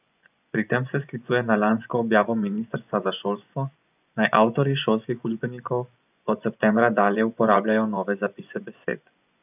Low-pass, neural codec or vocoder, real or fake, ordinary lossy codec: 3.6 kHz; vocoder, 24 kHz, 100 mel bands, Vocos; fake; none